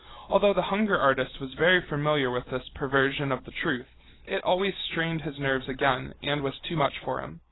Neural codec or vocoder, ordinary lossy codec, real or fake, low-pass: none; AAC, 16 kbps; real; 7.2 kHz